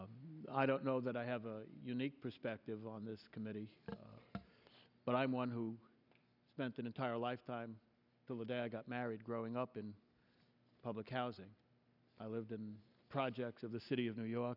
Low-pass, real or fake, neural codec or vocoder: 5.4 kHz; real; none